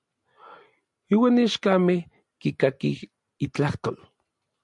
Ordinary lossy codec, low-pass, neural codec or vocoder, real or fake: MP3, 64 kbps; 10.8 kHz; none; real